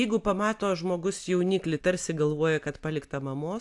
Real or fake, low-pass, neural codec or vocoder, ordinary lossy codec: real; 10.8 kHz; none; AAC, 64 kbps